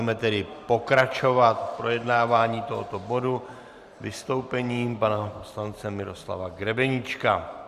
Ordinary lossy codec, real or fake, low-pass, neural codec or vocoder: Opus, 64 kbps; fake; 14.4 kHz; vocoder, 44.1 kHz, 128 mel bands every 512 samples, BigVGAN v2